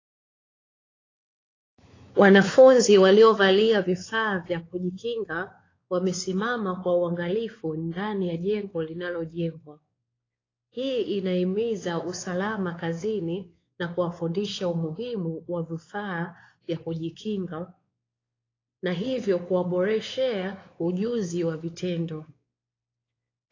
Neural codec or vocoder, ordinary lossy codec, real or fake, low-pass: codec, 16 kHz, 4 kbps, X-Codec, WavLM features, trained on Multilingual LibriSpeech; AAC, 32 kbps; fake; 7.2 kHz